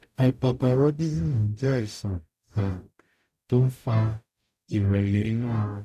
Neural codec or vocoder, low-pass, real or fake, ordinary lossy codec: codec, 44.1 kHz, 0.9 kbps, DAC; 14.4 kHz; fake; none